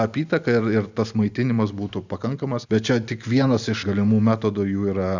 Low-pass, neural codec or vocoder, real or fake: 7.2 kHz; none; real